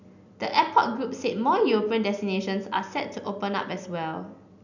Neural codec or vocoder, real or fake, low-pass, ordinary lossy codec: none; real; 7.2 kHz; none